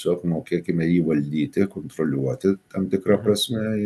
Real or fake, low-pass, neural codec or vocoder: real; 14.4 kHz; none